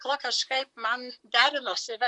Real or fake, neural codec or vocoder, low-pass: fake; vocoder, 44.1 kHz, 128 mel bands, Pupu-Vocoder; 10.8 kHz